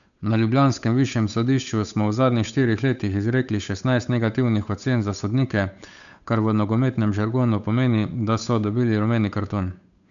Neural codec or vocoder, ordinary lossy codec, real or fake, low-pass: codec, 16 kHz, 8 kbps, FunCodec, trained on Chinese and English, 25 frames a second; none; fake; 7.2 kHz